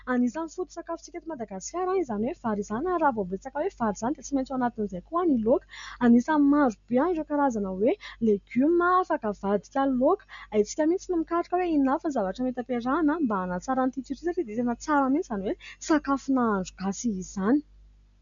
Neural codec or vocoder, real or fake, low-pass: none; real; 7.2 kHz